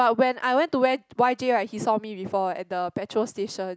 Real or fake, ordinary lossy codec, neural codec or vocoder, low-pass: real; none; none; none